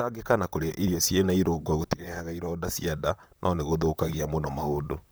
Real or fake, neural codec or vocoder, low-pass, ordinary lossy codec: fake; vocoder, 44.1 kHz, 128 mel bands, Pupu-Vocoder; none; none